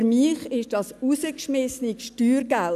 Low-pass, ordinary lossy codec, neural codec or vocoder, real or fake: 14.4 kHz; none; none; real